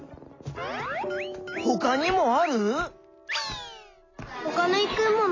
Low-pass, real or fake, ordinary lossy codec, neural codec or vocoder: 7.2 kHz; real; AAC, 32 kbps; none